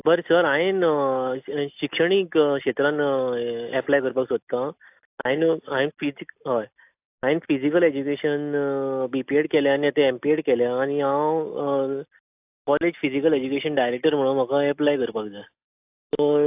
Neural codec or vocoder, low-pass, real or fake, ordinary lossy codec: none; 3.6 kHz; real; AAC, 32 kbps